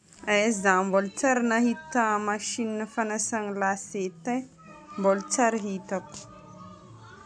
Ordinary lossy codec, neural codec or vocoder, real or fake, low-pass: none; none; real; none